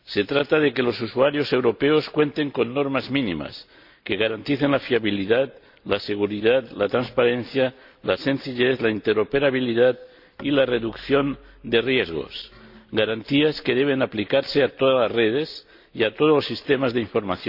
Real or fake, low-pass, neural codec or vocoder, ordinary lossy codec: real; 5.4 kHz; none; AAC, 48 kbps